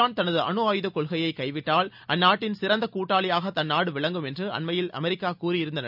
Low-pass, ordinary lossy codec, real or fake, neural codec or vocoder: 5.4 kHz; none; real; none